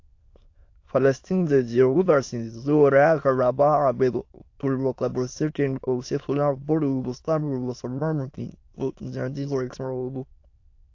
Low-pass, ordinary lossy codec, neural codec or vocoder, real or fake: 7.2 kHz; AAC, 48 kbps; autoencoder, 22.05 kHz, a latent of 192 numbers a frame, VITS, trained on many speakers; fake